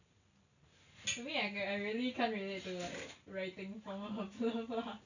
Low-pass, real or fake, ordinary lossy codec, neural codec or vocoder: 7.2 kHz; real; none; none